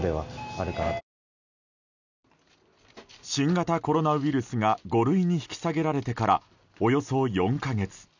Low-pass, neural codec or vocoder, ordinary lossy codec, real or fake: 7.2 kHz; none; none; real